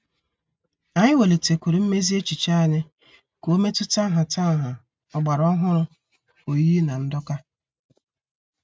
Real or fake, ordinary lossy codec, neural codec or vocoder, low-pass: real; none; none; none